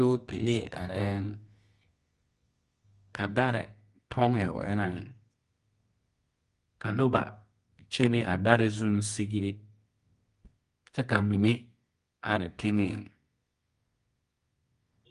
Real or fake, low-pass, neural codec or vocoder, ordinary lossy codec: fake; 10.8 kHz; codec, 24 kHz, 0.9 kbps, WavTokenizer, medium music audio release; Opus, 32 kbps